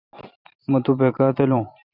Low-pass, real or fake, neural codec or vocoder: 5.4 kHz; fake; vocoder, 44.1 kHz, 128 mel bands every 512 samples, BigVGAN v2